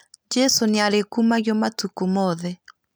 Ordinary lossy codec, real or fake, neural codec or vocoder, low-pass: none; real; none; none